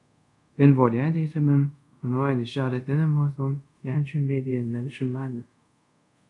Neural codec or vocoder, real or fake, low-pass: codec, 24 kHz, 0.5 kbps, DualCodec; fake; 10.8 kHz